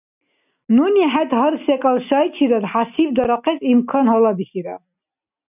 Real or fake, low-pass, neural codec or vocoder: real; 3.6 kHz; none